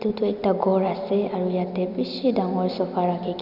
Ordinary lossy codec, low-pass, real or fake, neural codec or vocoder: none; 5.4 kHz; real; none